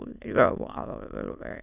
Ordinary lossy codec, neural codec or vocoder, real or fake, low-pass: none; autoencoder, 22.05 kHz, a latent of 192 numbers a frame, VITS, trained on many speakers; fake; 3.6 kHz